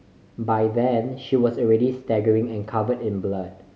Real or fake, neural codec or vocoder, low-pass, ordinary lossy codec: real; none; none; none